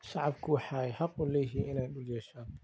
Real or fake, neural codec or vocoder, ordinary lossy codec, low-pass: real; none; none; none